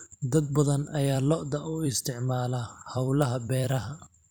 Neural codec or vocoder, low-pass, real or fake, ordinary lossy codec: vocoder, 44.1 kHz, 128 mel bands every 256 samples, BigVGAN v2; none; fake; none